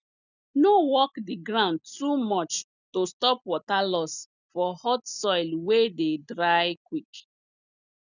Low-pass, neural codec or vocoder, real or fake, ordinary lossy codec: 7.2 kHz; none; real; none